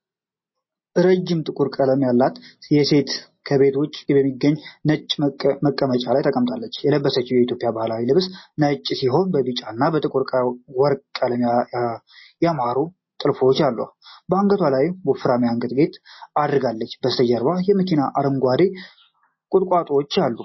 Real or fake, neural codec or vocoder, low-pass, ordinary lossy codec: real; none; 7.2 kHz; MP3, 24 kbps